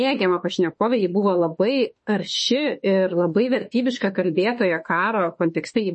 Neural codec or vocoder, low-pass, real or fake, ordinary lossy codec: autoencoder, 48 kHz, 32 numbers a frame, DAC-VAE, trained on Japanese speech; 10.8 kHz; fake; MP3, 32 kbps